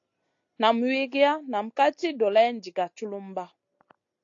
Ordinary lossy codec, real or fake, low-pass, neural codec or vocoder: AAC, 48 kbps; real; 7.2 kHz; none